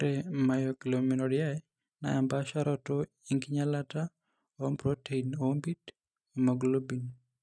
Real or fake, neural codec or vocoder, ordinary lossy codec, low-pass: real; none; none; none